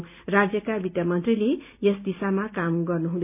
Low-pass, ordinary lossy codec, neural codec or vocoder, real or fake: 3.6 kHz; none; none; real